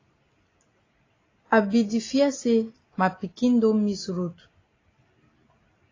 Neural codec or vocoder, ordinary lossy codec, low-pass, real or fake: none; AAC, 32 kbps; 7.2 kHz; real